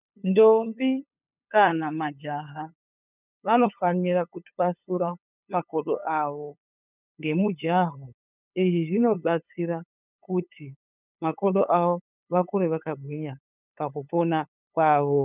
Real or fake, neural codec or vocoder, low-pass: fake; codec, 16 kHz, 8 kbps, FunCodec, trained on LibriTTS, 25 frames a second; 3.6 kHz